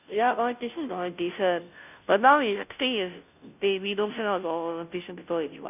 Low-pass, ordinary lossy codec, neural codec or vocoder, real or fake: 3.6 kHz; none; codec, 16 kHz, 0.5 kbps, FunCodec, trained on Chinese and English, 25 frames a second; fake